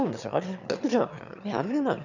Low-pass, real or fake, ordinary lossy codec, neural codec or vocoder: 7.2 kHz; fake; none; autoencoder, 22.05 kHz, a latent of 192 numbers a frame, VITS, trained on one speaker